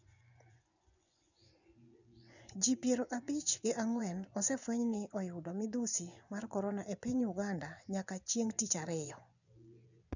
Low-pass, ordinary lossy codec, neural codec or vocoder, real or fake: 7.2 kHz; none; vocoder, 24 kHz, 100 mel bands, Vocos; fake